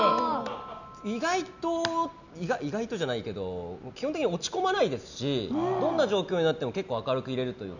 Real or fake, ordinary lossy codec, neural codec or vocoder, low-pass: real; none; none; 7.2 kHz